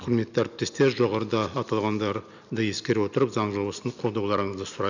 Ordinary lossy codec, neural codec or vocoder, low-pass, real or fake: none; none; 7.2 kHz; real